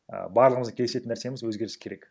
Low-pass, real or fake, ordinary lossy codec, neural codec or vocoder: none; real; none; none